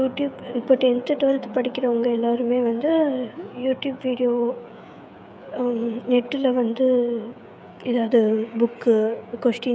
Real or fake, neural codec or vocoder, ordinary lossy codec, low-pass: fake; codec, 16 kHz, 16 kbps, FreqCodec, smaller model; none; none